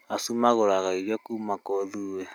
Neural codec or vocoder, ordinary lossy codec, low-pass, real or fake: none; none; none; real